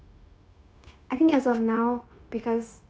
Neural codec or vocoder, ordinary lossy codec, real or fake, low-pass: codec, 16 kHz, 0.9 kbps, LongCat-Audio-Codec; none; fake; none